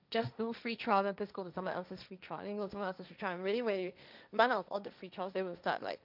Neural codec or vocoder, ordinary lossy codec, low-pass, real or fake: codec, 16 kHz, 1.1 kbps, Voila-Tokenizer; none; 5.4 kHz; fake